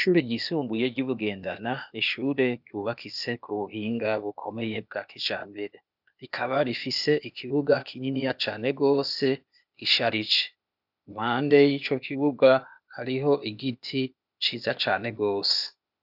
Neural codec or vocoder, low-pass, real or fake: codec, 16 kHz, 0.8 kbps, ZipCodec; 5.4 kHz; fake